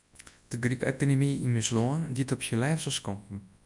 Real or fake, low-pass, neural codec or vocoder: fake; 10.8 kHz; codec, 24 kHz, 0.9 kbps, WavTokenizer, large speech release